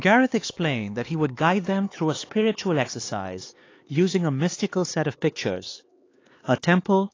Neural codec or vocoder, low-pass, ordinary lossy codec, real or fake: codec, 16 kHz, 4 kbps, X-Codec, HuBERT features, trained on LibriSpeech; 7.2 kHz; AAC, 32 kbps; fake